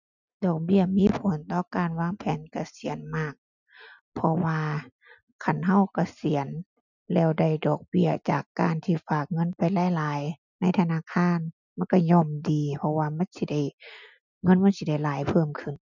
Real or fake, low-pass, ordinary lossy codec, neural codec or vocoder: real; 7.2 kHz; none; none